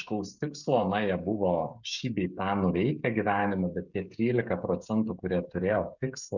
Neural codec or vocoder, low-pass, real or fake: codec, 16 kHz, 16 kbps, FreqCodec, smaller model; 7.2 kHz; fake